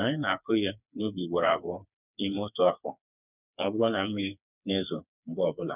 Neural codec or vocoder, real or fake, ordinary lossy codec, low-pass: codec, 16 kHz, 4 kbps, FreqCodec, smaller model; fake; none; 3.6 kHz